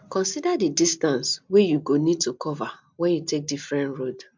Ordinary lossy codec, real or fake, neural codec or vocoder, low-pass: MP3, 64 kbps; real; none; 7.2 kHz